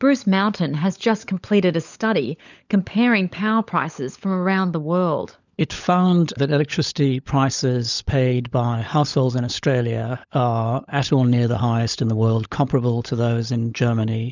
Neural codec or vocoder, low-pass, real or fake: codec, 16 kHz, 16 kbps, FunCodec, trained on LibriTTS, 50 frames a second; 7.2 kHz; fake